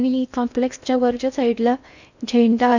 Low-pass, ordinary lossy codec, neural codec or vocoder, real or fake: 7.2 kHz; none; codec, 16 kHz in and 24 kHz out, 0.6 kbps, FocalCodec, streaming, 2048 codes; fake